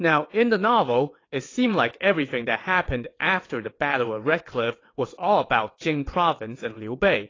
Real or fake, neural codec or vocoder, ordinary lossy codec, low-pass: fake; vocoder, 22.05 kHz, 80 mel bands, WaveNeXt; AAC, 32 kbps; 7.2 kHz